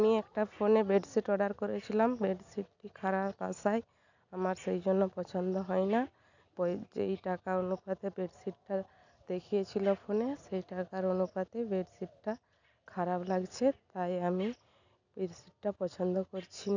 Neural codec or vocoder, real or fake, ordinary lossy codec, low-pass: none; real; none; 7.2 kHz